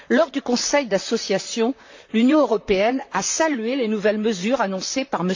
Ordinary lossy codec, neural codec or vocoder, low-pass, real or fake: AAC, 48 kbps; vocoder, 44.1 kHz, 128 mel bands, Pupu-Vocoder; 7.2 kHz; fake